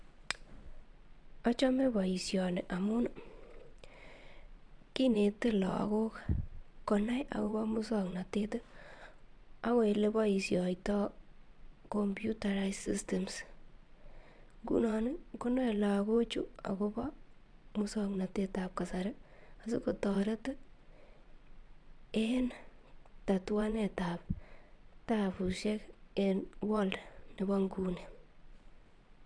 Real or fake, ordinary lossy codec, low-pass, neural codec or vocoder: fake; none; 9.9 kHz; vocoder, 22.05 kHz, 80 mel bands, Vocos